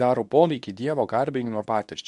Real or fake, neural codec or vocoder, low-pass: fake; codec, 24 kHz, 0.9 kbps, WavTokenizer, medium speech release version 2; 10.8 kHz